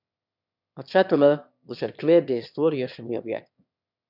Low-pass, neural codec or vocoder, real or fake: 5.4 kHz; autoencoder, 22.05 kHz, a latent of 192 numbers a frame, VITS, trained on one speaker; fake